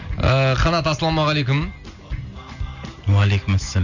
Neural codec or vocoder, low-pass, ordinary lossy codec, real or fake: none; 7.2 kHz; none; real